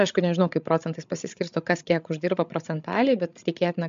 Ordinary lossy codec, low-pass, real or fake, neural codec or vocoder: MP3, 64 kbps; 7.2 kHz; fake; codec, 16 kHz, 16 kbps, FreqCodec, larger model